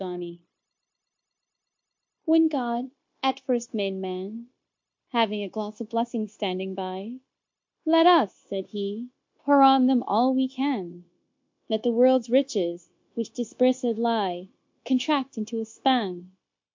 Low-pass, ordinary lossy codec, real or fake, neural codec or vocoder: 7.2 kHz; MP3, 48 kbps; fake; codec, 16 kHz, 0.9 kbps, LongCat-Audio-Codec